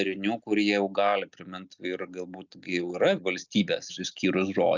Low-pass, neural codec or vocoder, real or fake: 7.2 kHz; none; real